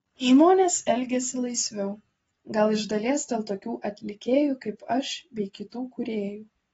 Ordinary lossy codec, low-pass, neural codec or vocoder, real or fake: AAC, 24 kbps; 19.8 kHz; none; real